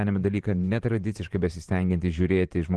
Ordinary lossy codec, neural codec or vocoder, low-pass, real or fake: Opus, 16 kbps; vocoder, 44.1 kHz, 128 mel bands every 512 samples, BigVGAN v2; 10.8 kHz; fake